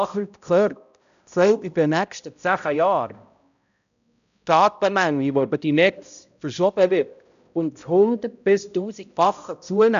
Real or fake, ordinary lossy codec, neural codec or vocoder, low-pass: fake; none; codec, 16 kHz, 0.5 kbps, X-Codec, HuBERT features, trained on balanced general audio; 7.2 kHz